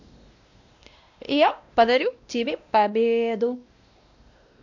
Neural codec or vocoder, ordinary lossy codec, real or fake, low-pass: codec, 16 kHz, 1 kbps, X-Codec, WavLM features, trained on Multilingual LibriSpeech; none; fake; 7.2 kHz